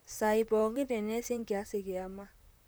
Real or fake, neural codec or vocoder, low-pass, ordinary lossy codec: fake; vocoder, 44.1 kHz, 128 mel bands, Pupu-Vocoder; none; none